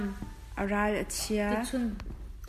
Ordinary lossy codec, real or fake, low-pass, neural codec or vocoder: MP3, 96 kbps; real; 14.4 kHz; none